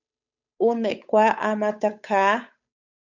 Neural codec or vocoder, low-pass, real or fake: codec, 16 kHz, 8 kbps, FunCodec, trained on Chinese and English, 25 frames a second; 7.2 kHz; fake